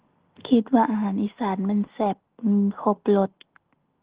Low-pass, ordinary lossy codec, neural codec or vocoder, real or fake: 3.6 kHz; Opus, 16 kbps; none; real